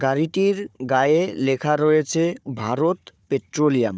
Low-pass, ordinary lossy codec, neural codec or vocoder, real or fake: none; none; codec, 16 kHz, 4 kbps, FunCodec, trained on Chinese and English, 50 frames a second; fake